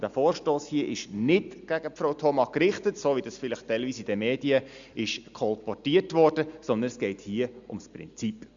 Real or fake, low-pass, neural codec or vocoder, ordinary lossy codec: real; 7.2 kHz; none; Opus, 64 kbps